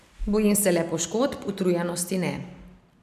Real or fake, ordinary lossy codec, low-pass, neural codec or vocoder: real; none; 14.4 kHz; none